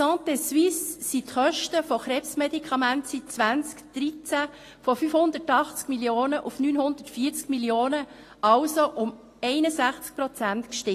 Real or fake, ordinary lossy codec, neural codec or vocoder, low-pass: real; AAC, 48 kbps; none; 14.4 kHz